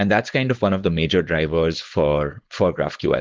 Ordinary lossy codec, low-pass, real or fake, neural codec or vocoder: Opus, 16 kbps; 7.2 kHz; fake; codec, 16 kHz, 4.8 kbps, FACodec